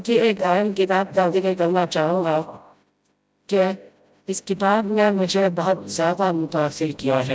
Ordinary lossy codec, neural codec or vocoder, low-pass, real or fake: none; codec, 16 kHz, 0.5 kbps, FreqCodec, smaller model; none; fake